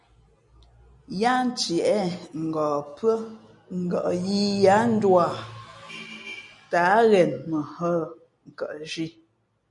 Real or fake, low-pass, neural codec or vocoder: real; 10.8 kHz; none